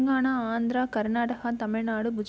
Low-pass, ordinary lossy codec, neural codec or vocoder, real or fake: none; none; none; real